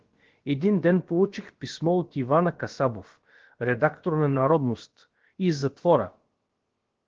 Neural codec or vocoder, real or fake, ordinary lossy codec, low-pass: codec, 16 kHz, about 1 kbps, DyCAST, with the encoder's durations; fake; Opus, 16 kbps; 7.2 kHz